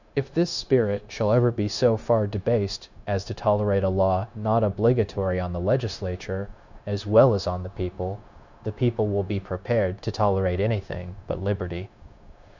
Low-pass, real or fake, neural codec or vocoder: 7.2 kHz; fake; codec, 16 kHz, 0.9 kbps, LongCat-Audio-Codec